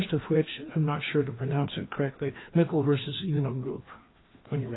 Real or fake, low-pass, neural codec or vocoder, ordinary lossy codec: fake; 7.2 kHz; codec, 16 kHz, 2 kbps, FreqCodec, larger model; AAC, 16 kbps